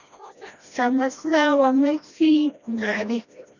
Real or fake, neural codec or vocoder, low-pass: fake; codec, 16 kHz, 1 kbps, FreqCodec, smaller model; 7.2 kHz